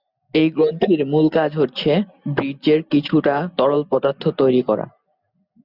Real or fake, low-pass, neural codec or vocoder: real; 5.4 kHz; none